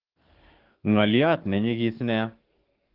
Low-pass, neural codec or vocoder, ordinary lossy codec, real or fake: 5.4 kHz; codec, 44.1 kHz, 7.8 kbps, DAC; Opus, 16 kbps; fake